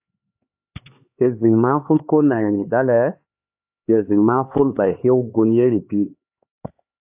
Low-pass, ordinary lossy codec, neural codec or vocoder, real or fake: 3.6 kHz; AAC, 32 kbps; codec, 16 kHz, 4 kbps, X-Codec, HuBERT features, trained on LibriSpeech; fake